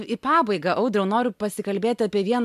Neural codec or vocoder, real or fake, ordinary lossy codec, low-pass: none; real; MP3, 96 kbps; 14.4 kHz